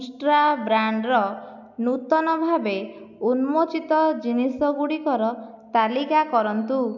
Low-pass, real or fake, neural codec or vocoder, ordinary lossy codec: 7.2 kHz; real; none; none